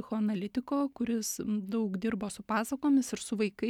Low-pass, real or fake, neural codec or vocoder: 19.8 kHz; real; none